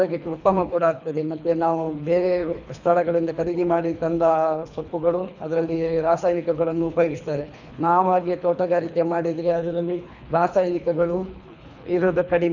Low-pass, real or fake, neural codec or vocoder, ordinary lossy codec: 7.2 kHz; fake; codec, 24 kHz, 3 kbps, HILCodec; none